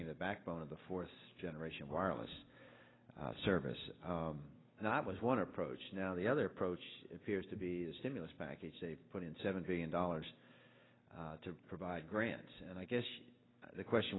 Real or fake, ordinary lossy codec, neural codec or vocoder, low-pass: real; AAC, 16 kbps; none; 7.2 kHz